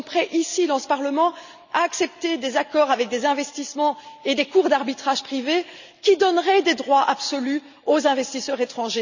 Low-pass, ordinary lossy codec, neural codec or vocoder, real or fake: 7.2 kHz; none; none; real